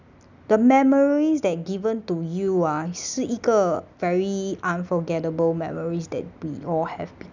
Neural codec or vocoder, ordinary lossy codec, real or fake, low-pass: none; none; real; 7.2 kHz